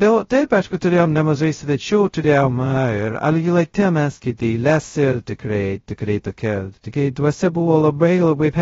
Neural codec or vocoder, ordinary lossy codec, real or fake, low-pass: codec, 16 kHz, 0.2 kbps, FocalCodec; AAC, 24 kbps; fake; 7.2 kHz